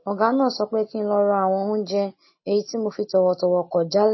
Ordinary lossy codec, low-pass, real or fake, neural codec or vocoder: MP3, 24 kbps; 7.2 kHz; real; none